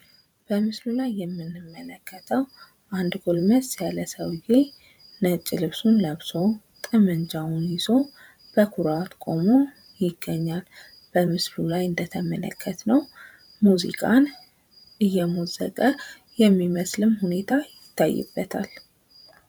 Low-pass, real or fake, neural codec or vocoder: 19.8 kHz; fake; vocoder, 44.1 kHz, 128 mel bands every 512 samples, BigVGAN v2